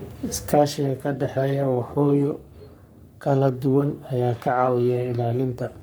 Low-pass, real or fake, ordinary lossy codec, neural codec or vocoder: none; fake; none; codec, 44.1 kHz, 3.4 kbps, Pupu-Codec